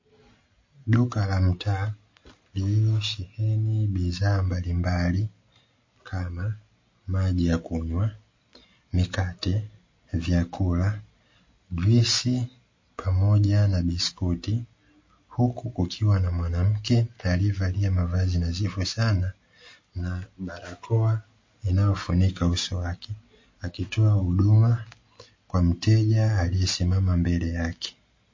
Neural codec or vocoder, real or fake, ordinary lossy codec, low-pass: none; real; MP3, 32 kbps; 7.2 kHz